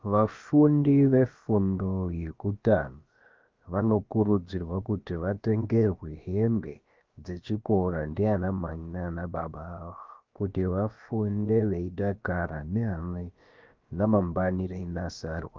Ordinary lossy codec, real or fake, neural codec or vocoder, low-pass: Opus, 24 kbps; fake; codec, 16 kHz, about 1 kbps, DyCAST, with the encoder's durations; 7.2 kHz